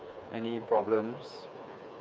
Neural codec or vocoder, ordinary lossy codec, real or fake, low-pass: codec, 16 kHz, 4 kbps, FreqCodec, smaller model; none; fake; none